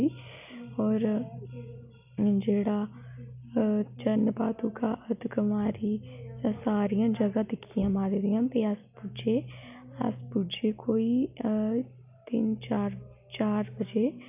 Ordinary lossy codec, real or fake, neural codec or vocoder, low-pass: none; real; none; 3.6 kHz